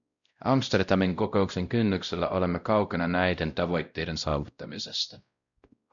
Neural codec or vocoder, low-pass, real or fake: codec, 16 kHz, 0.5 kbps, X-Codec, WavLM features, trained on Multilingual LibriSpeech; 7.2 kHz; fake